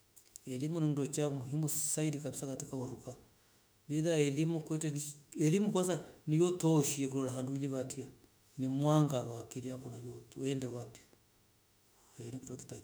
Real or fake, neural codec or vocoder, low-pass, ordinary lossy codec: fake; autoencoder, 48 kHz, 32 numbers a frame, DAC-VAE, trained on Japanese speech; none; none